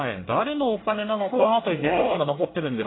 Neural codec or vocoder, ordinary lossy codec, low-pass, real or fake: codec, 24 kHz, 1 kbps, SNAC; AAC, 16 kbps; 7.2 kHz; fake